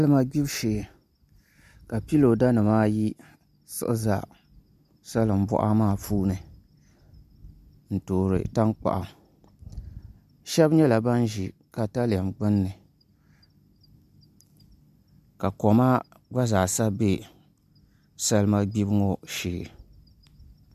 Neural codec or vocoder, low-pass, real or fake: none; 14.4 kHz; real